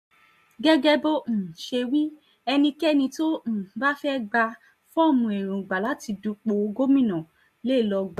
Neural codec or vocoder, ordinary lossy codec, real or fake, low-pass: none; MP3, 64 kbps; real; 14.4 kHz